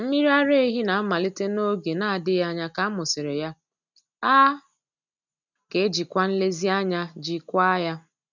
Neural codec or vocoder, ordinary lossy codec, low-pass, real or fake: none; none; 7.2 kHz; real